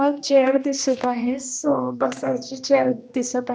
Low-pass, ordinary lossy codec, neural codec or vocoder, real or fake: none; none; codec, 16 kHz, 1 kbps, X-Codec, HuBERT features, trained on general audio; fake